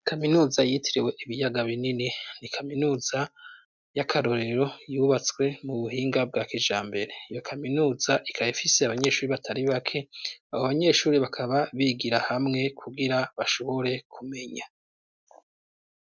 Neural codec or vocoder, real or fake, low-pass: none; real; 7.2 kHz